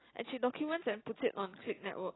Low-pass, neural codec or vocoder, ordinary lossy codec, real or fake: 7.2 kHz; none; AAC, 16 kbps; real